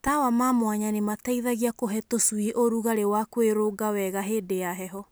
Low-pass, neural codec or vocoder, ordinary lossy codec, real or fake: none; none; none; real